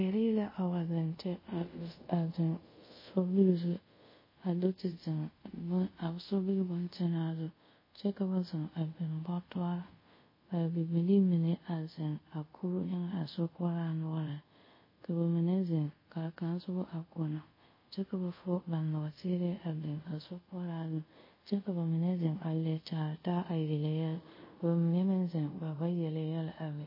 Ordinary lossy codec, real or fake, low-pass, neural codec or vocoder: MP3, 24 kbps; fake; 5.4 kHz; codec, 24 kHz, 0.5 kbps, DualCodec